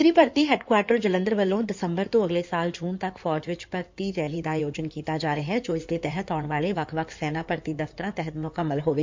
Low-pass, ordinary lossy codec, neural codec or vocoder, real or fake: 7.2 kHz; MP3, 64 kbps; codec, 16 kHz in and 24 kHz out, 2.2 kbps, FireRedTTS-2 codec; fake